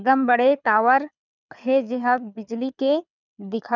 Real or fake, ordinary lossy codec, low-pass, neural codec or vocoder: fake; none; 7.2 kHz; codec, 24 kHz, 6 kbps, HILCodec